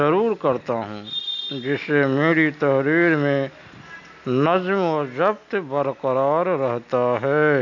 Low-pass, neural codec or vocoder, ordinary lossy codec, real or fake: 7.2 kHz; none; none; real